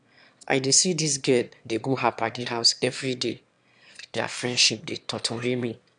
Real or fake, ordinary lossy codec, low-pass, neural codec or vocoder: fake; none; 9.9 kHz; autoencoder, 22.05 kHz, a latent of 192 numbers a frame, VITS, trained on one speaker